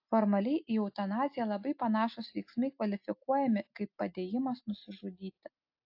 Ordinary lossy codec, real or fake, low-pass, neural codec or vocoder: AAC, 48 kbps; real; 5.4 kHz; none